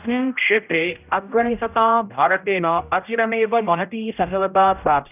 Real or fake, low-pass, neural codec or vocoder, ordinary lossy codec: fake; 3.6 kHz; codec, 16 kHz, 0.5 kbps, X-Codec, HuBERT features, trained on general audio; none